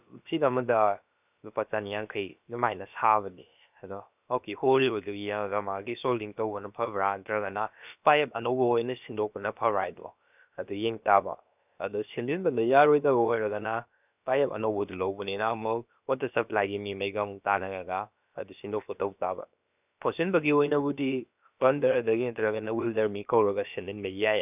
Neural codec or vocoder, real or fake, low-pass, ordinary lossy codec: codec, 16 kHz, about 1 kbps, DyCAST, with the encoder's durations; fake; 3.6 kHz; none